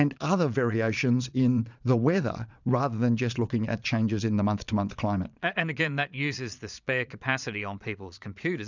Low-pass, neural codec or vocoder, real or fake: 7.2 kHz; vocoder, 22.05 kHz, 80 mel bands, Vocos; fake